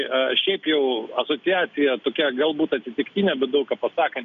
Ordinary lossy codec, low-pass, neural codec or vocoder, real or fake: AAC, 96 kbps; 7.2 kHz; none; real